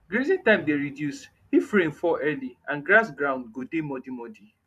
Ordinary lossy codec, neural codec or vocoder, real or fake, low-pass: none; vocoder, 44.1 kHz, 128 mel bands every 512 samples, BigVGAN v2; fake; 14.4 kHz